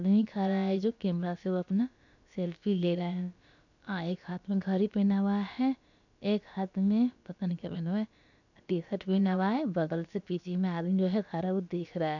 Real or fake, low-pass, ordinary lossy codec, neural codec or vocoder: fake; 7.2 kHz; none; codec, 16 kHz, about 1 kbps, DyCAST, with the encoder's durations